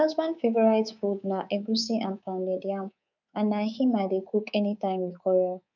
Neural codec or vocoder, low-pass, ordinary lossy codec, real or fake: codec, 24 kHz, 3.1 kbps, DualCodec; 7.2 kHz; none; fake